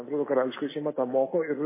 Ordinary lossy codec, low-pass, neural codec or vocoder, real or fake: MP3, 16 kbps; 3.6 kHz; none; real